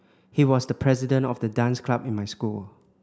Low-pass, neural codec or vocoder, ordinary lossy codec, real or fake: none; none; none; real